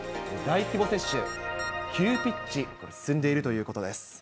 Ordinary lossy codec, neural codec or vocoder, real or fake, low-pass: none; none; real; none